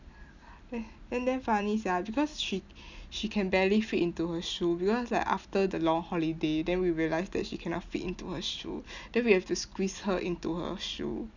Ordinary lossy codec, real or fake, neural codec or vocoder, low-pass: none; real; none; 7.2 kHz